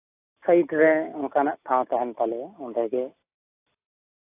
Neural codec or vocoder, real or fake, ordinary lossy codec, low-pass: none; real; AAC, 24 kbps; 3.6 kHz